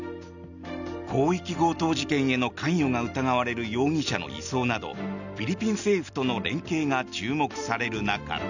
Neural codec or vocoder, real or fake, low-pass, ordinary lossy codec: none; real; 7.2 kHz; none